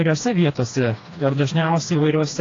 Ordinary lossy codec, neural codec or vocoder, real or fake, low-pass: AAC, 32 kbps; codec, 16 kHz, 2 kbps, FreqCodec, smaller model; fake; 7.2 kHz